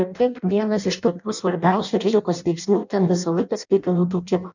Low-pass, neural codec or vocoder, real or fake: 7.2 kHz; codec, 16 kHz in and 24 kHz out, 0.6 kbps, FireRedTTS-2 codec; fake